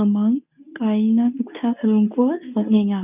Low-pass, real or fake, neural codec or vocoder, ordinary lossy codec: 3.6 kHz; fake; codec, 24 kHz, 0.9 kbps, WavTokenizer, medium speech release version 2; none